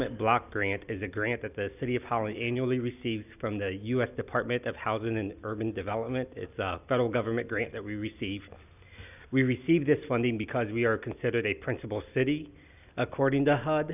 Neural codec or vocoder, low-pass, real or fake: none; 3.6 kHz; real